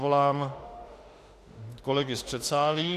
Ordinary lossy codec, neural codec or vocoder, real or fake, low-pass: AAC, 64 kbps; autoencoder, 48 kHz, 32 numbers a frame, DAC-VAE, trained on Japanese speech; fake; 14.4 kHz